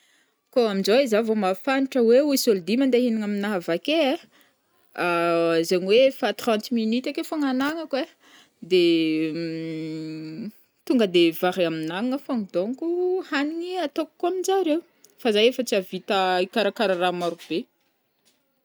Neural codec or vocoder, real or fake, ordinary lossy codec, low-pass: none; real; none; none